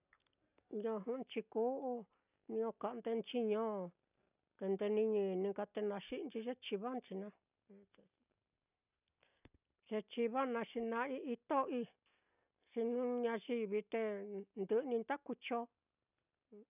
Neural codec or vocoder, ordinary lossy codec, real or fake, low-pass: none; none; real; 3.6 kHz